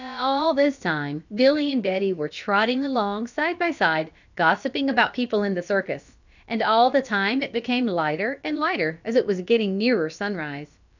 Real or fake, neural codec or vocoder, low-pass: fake; codec, 16 kHz, about 1 kbps, DyCAST, with the encoder's durations; 7.2 kHz